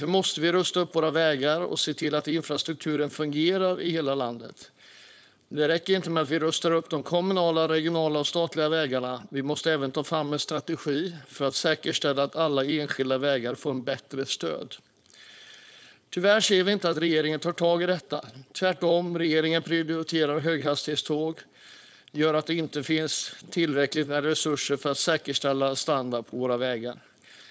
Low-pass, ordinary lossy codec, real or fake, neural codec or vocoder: none; none; fake; codec, 16 kHz, 4.8 kbps, FACodec